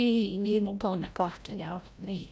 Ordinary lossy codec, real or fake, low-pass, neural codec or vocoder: none; fake; none; codec, 16 kHz, 0.5 kbps, FreqCodec, larger model